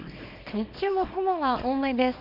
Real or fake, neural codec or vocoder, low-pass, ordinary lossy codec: fake; codec, 24 kHz, 0.9 kbps, WavTokenizer, small release; 5.4 kHz; none